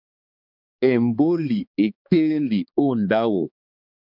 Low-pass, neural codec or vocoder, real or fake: 5.4 kHz; codec, 16 kHz, 2 kbps, X-Codec, HuBERT features, trained on balanced general audio; fake